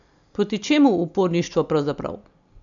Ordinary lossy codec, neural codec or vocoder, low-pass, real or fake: none; none; 7.2 kHz; real